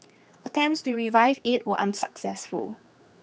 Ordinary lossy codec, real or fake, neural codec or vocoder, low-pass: none; fake; codec, 16 kHz, 2 kbps, X-Codec, HuBERT features, trained on general audio; none